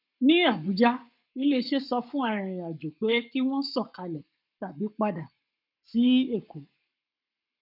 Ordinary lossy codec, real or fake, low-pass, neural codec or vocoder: none; fake; 5.4 kHz; codec, 44.1 kHz, 7.8 kbps, Pupu-Codec